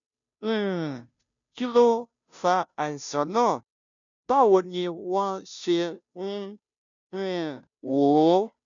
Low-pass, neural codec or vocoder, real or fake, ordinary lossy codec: 7.2 kHz; codec, 16 kHz, 0.5 kbps, FunCodec, trained on Chinese and English, 25 frames a second; fake; none